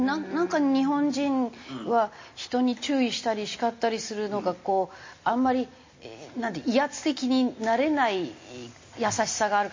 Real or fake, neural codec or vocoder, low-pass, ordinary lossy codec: real; none; 7.2 kHz; MP3, 32 kbps